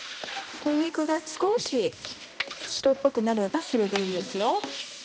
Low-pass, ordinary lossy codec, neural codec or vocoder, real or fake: none; none; codec, 16 kHz, 1 kbps, X-Codec, HuBERT features, trained on balanced general audio; fake